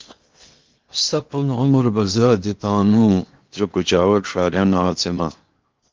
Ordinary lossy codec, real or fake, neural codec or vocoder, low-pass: Opus, 32 kbps; fake; codec, 16 kHz in and 24 kHz out, 0.8 kbps, FocalCodec, streaming, 65536 codes; 7.2 kHz